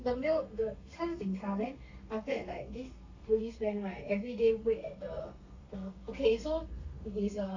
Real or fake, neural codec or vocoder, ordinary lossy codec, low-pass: fake; codec, 32 kHz, 1.9 kbps, SNAC; AAC, 48 kbps; 7.2 kHz